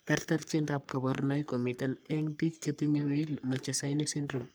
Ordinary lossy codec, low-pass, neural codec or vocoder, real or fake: none; none; codec, 44.1 kHz, 3.4 kbps, Pupu-Codec; fake